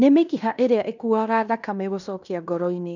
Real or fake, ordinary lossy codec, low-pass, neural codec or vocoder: fake; none; 7.2 kHz; codec, 16 kHz in and 24 kHz out, 0.9 kbps, LongCat-Audio-Codec, fine tuned four codebook decoder